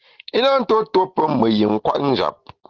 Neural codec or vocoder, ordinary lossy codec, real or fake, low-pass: none; Opus, 16 kbps; real; 7.2 kHz